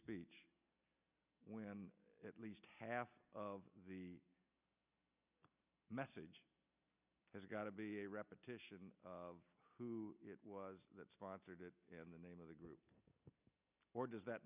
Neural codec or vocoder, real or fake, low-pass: none; real; 3.6 kHz